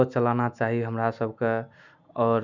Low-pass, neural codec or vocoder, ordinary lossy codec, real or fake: 7.2 kHz; none; none; real